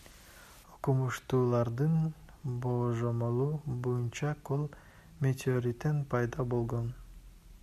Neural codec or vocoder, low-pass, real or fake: none; 14.4 kHz; real